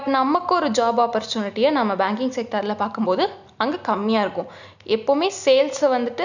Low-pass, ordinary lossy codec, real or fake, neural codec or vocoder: 7.2 kHz; none; real; none